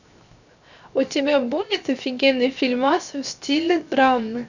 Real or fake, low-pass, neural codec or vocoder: fake; 7.2 kHz; codec, 16 kHz, 0.7 kbps, FocalCodec